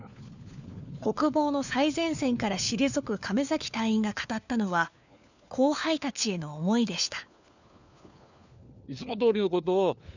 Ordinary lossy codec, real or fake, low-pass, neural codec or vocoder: none; fake; 7.2 kHz; codec, 16 kHz, 4 kbps, FunCodec, trained on LibriTTS, 50 frames a second